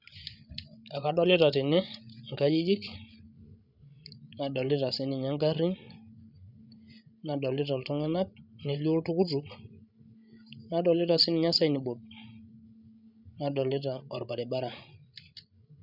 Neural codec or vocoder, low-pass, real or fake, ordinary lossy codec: codec, 16 kHz, 16 kbps, FreqCodec, larger model; 5.4 kHz; fake; none